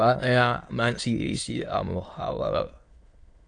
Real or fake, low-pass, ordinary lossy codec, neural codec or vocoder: fake; 9.9 kHz; AAC, 48 kbps; autoencoder, 22.05 kHz, a latent of 192 numbers a frame, VITS, trained on many speakers